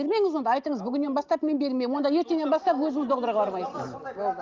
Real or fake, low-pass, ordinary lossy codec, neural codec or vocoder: real; 7.2 kHz; Opus, 24 kbps; none